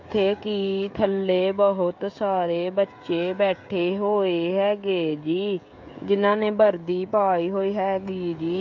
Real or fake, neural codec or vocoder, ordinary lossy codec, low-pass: fake; codec, 16 kHz, 16 kbps, FreqCodec, smaller model; AAC, 48 kbps; 7.2 kHz